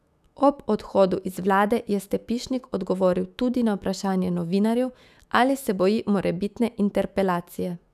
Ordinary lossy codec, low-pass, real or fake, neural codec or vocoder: none; 14.4 kHz; fake; autoencoder, 48 kHz, 128 numbers a frame, DAC-VAE, trained on Japanese speech